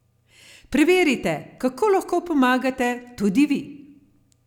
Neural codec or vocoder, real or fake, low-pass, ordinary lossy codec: none; real; 19.8 kHz; none